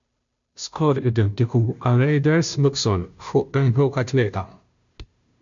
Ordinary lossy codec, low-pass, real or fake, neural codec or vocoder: MP3, 64 kbps; 7.2 kHz; fake; codec, 16 kHz, 0.5 kbps, FunCodec, trained on Chinese and English, 25 frames a second